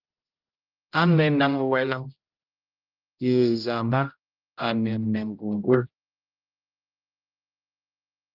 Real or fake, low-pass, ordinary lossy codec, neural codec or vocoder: fake; 5.4 kHz; Opus, 24 kbps; codec, 16 kHz, 0.5 kbps, X-Codec, HuBERT features, trained on general audio